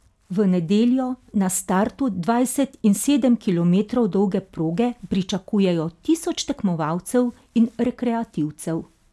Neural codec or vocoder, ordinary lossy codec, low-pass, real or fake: none; none; none; real